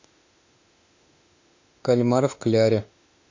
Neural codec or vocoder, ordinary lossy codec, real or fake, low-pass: autoencoder, 48 kHz, 32 numbers a frame, DAC-VAE, trained on Japanese speech; AAC, 48 kbps; fake; 7.2 kHz